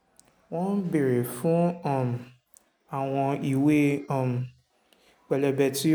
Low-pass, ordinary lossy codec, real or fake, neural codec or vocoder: none; none; real; none